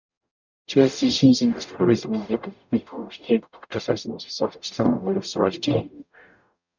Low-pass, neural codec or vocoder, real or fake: 7.2 kHz; codec, 44.1 kHz, 0.9 kbps, DAC; fake